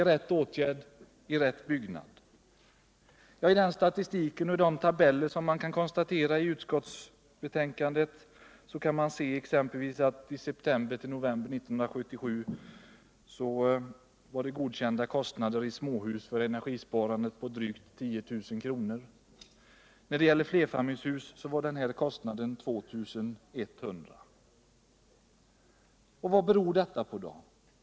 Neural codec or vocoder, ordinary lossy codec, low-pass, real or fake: none; none; none; real